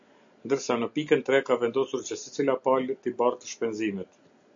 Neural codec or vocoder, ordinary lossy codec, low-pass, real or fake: none; AAC, 64 kbps; 7.2 kHz; real